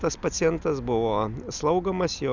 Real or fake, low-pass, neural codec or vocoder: real; 7.2 kHz; none